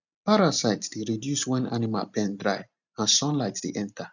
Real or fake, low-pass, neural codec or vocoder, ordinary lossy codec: real; 7.2 kHz; none; none